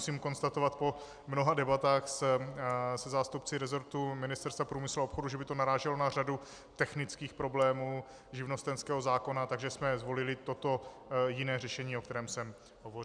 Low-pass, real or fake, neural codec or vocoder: 9.9 kHz; real; none